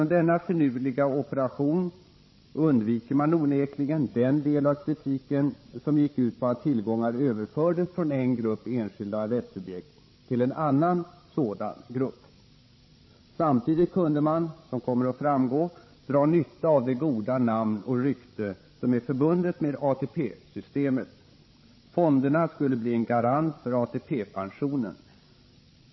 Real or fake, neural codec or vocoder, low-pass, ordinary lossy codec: fake; codec, 16 kHz, 16 kbps, FreqCodec, larger model; 7.2 kHz; MP3, 24 kbps